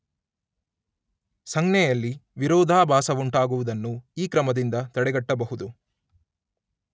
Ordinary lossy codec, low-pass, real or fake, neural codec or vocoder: none; none; real; none